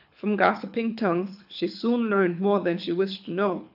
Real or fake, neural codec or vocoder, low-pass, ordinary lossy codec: fake; codec, 24 kHz, 6 kbps, HILCodec; 5.4 kHz; AAC, 48 kbps